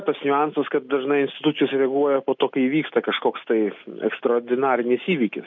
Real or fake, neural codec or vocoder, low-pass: real; none; 7.2 kHz